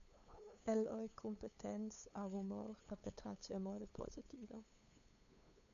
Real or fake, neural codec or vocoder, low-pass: fake; codec, 16 kHz, 8 kbps, FunCodec, trained on LibriTTS, 25 frames a second; 7.2 kHz